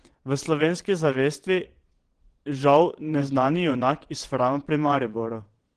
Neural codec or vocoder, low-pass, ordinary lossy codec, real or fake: vocoder, 22.05 kHz, 80 mel bands, WaveNeXt; 9.9 kHz; Opus, 16 kbps; fake